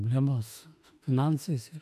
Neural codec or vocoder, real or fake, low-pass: autoencoder, 48 kHz, 32 numbers a frame, DAC-VAE, trained on Japanese speech; fake; 14.4 kHz